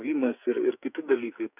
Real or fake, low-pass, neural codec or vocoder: fake; 3.6 kHz; codec, 44.1 kHz, 2.6 kbps, SNAC